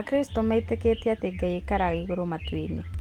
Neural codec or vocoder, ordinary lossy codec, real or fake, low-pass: none; Opus, 24 kbps; real; 19.8 kHz